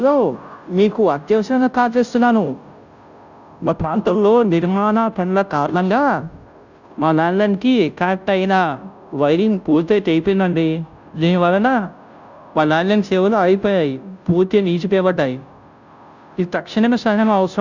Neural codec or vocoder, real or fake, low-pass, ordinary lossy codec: codec, 16 kHz, 0.5 kbps, FunCodec, trained on Chinese and English, 25 frames a second; fake; 7.2 kHz; none